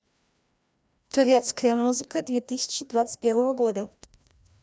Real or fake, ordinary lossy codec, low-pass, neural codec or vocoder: fake; none; none; codec, 16 kHz, 1 kbps, FreqCodec, larger model